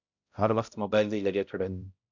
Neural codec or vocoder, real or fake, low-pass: codec, 16 kHz, 0.5 kbps, X-Codec, HuBERT features, trained on balanced general audio; fake; 7.2 kHz